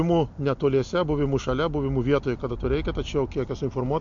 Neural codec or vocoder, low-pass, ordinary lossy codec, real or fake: none; 7.2 kHz; MP3, 48 kbps; real